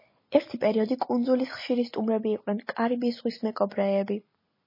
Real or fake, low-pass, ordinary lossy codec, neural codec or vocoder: real; 5.4 kHz; MP3, 24 kbps; none